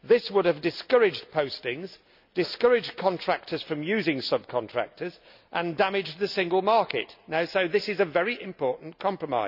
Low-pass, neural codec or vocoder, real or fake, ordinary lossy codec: 5.4 kHz; none; real; none